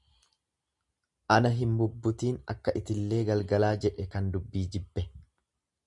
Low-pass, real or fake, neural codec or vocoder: 10.8 kHz; real; none